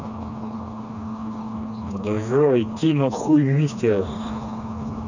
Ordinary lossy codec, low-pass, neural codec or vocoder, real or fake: none; 7.2 kHz; codec, 16 kHz, 2 kbps, FreqCodec, smaller model; fake